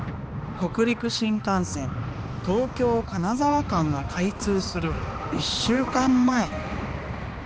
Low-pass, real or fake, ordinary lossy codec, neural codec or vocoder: none; fake; none; codec, 16 kHz, 2 kbps, X-Codec, HuBERT features, trained on balanced general audio